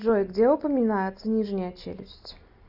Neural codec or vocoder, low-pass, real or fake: none; 5.4 kHz; real